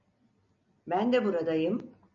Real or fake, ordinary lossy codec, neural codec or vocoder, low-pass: real; MP3, 64 kbps; none; 7.2 kHz